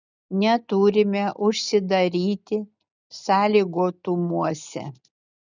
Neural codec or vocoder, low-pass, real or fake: none; 7.2 kHz; real